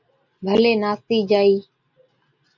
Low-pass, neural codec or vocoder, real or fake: 7.2 kHz; none; real